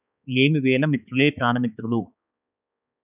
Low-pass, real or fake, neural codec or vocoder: 3.6 kHz; fake; codec, 16 kHz, 4 kbps, X-Codec, HuBERT features, trained on balanced general audio